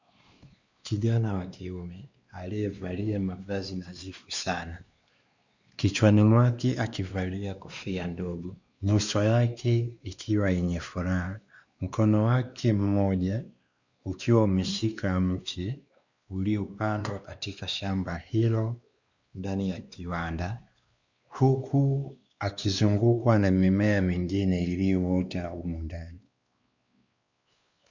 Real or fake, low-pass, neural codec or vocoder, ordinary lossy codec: fake; 7.2 kHz; codec, 16 kHz, 2 kbps, X-Codec, WavLM features, trained on Multilingual LibriSpeech; Opus, 64 kbps